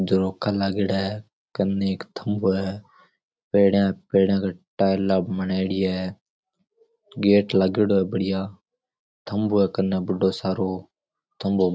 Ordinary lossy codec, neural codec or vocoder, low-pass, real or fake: none; none; none; real